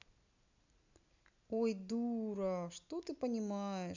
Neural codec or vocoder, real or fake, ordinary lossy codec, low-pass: none; real; none; 7.2 kHz